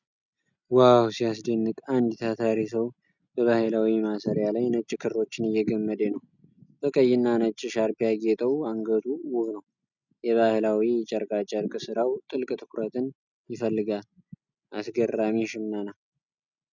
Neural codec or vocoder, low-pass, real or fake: none; 7.2 kHz; real